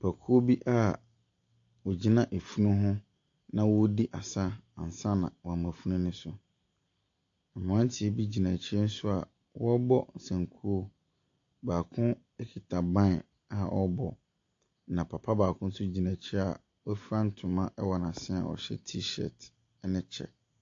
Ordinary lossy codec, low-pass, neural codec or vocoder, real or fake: AAC, 48 kbps; 7.2 kHz; none; real